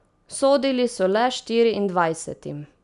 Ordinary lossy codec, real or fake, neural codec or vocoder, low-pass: none; real; none; 10.8 kHz